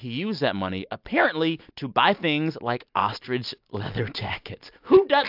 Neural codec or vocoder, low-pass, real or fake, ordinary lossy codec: none; 5.4 kHz; real; AAC, 48 kbps